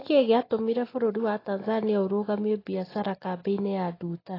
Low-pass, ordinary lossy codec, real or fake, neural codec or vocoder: 5.4 kHz; AAC, 24 kbps; real; none